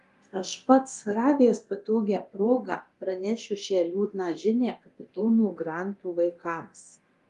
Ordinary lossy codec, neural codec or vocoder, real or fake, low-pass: Opus, 32 kbps; codec, 24 kHz, 0.9 kbps, DualCodec; fake; 10.8 kHz